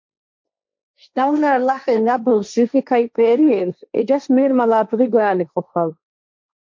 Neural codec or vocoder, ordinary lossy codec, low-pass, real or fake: codec, 16 kHz, 1.1 kbps, Voila-Tokenizer; MP3, 48 kbps; 7.2 kHz; fake